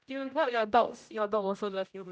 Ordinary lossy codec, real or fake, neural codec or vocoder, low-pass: none; fake; codec, 16 kHz, 0.5 kbps, X-Codec, HuBERT features, trained on general audio; none